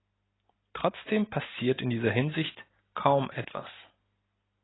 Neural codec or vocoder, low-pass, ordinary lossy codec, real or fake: none; 7.2 kHz; AAC, 16 kbps; real